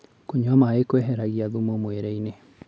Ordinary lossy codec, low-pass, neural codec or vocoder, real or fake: none; none; none; real